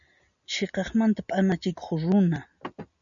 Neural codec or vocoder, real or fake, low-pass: none; real; 7.2 kHz